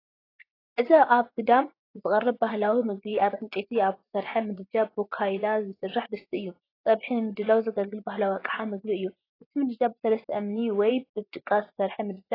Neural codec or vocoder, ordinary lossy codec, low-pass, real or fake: vocoder, 24 kHz, 100 mel bands, Vocos; AAC, 24 kbps; 5.4 kHz; fake